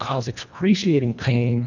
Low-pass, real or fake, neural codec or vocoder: 7.2 kHz; fake; codec, 24 kHz, 1.5 kbps, HILCodec